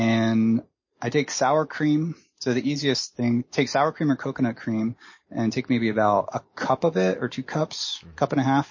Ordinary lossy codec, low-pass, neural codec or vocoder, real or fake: MP3, 32 kbps; 7.2 kHz; none; real